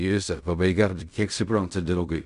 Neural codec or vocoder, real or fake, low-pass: codec, 16 kHz in and 24 kHz out, 0.4 kbps, LongCat-Audio-Codec, fine tuned four codebook decoder; fake; 10.8 kHz